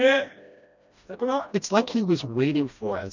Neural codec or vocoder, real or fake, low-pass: codec, 16 kHz, 1 kbps, FreqCodec, smaller model; fake; 7.2 kHz